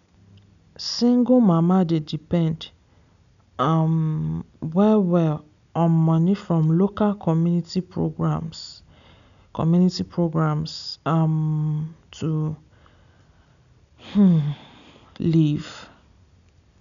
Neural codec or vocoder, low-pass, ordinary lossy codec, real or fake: none; 7.2 kHz; none; real